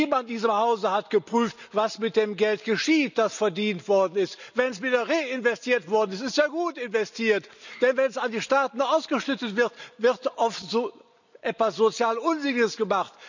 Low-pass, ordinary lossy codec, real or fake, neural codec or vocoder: 7.2 kHz; none; real; none